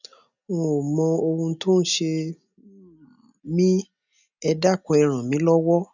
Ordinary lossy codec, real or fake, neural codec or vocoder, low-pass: none; real; none; 7.2 kHz